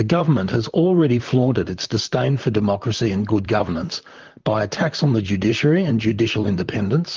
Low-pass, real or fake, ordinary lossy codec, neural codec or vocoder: 7.2 kHz; fake; Opus, 24 kbps; vocoder, 44.1 kHz, 128 mel bands, Pupu-Vocoder